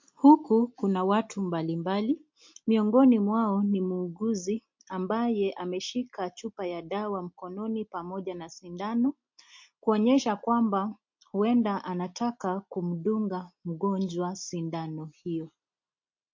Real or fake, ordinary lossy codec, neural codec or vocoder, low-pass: real; MP3, 48 kbps; none; 7.2 kHz